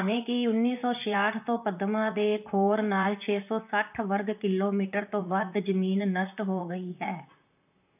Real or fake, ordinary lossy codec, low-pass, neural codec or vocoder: fake; none; 3.6 kHz; vocoder, 44.1 kHz, 128 mel bands, Pupu-Vocoder